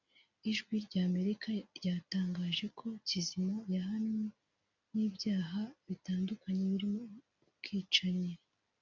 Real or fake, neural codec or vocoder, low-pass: real; none; 7.2 kHz